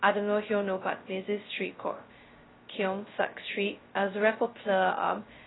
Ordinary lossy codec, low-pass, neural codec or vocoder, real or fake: AAC, 16 kbps; 7.2 kHz; codec, 16 kHz, 0.2 kbps, FocalCodec; fake